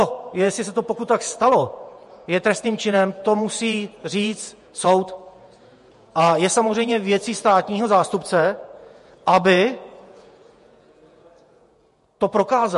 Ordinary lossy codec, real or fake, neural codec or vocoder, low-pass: MP3, 48 kbps; fake; vocoder, 48 kHz, 128 mel bands, Vocos; 14.4 kHz